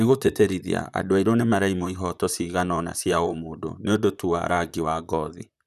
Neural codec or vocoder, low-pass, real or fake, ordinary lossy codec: vocoder, 44.1 kHz, 128 mel bands, Pupu-Vocoder; 14.4 kHz; fake; none